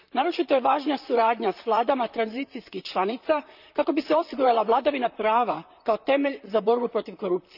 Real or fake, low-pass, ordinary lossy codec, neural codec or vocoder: fake; 5.4 kHz; none; vocoder, 44.1 kHz, 128 mel bands, Pupu-Vocoder